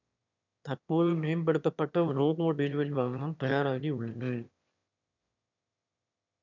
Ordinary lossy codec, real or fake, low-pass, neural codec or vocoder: none; fake; 7.2 kHz; autoencoder, 22.05 kHz, a latent of 192 numbers a frame, VITS, trained on one speaker